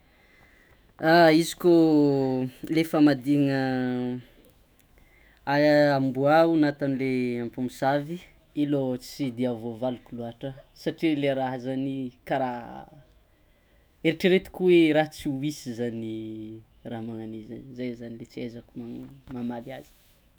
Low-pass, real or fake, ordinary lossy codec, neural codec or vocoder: none; fake; none; autoencoder, 48 kHz, 128 numbers a frame, DAC-VAE, trained on Japanese speech